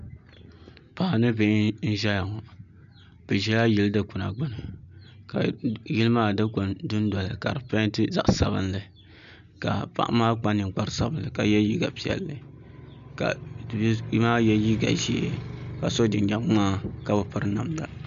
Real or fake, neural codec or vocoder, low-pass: real; none; 7.2 kHz